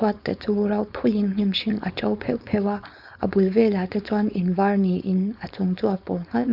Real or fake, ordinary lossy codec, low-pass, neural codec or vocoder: fake; none; 5.4 kHz; codec, 16 kHz, 4.8 kbps, FACodec